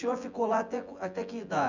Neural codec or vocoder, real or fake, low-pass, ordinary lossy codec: vocoder, 24 kHz, 100 mel bands, Vocos; fake; 7.2 kHz; Opus, 64 kbps